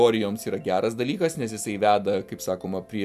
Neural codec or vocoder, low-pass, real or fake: none; 14.4 kHz; real